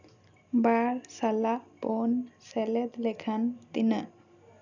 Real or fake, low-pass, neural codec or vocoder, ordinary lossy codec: real; 7.2 kHz; none; none